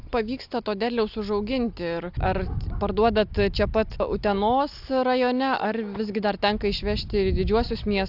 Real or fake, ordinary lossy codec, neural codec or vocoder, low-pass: real; AAC, 48 kbps; none; 5.4 kHz